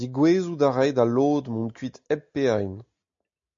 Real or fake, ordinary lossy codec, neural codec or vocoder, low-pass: real; MP3, 48 kbps; none; 7.2 kHz